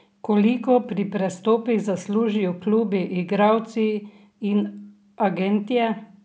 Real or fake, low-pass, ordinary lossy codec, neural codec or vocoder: real; none; none; none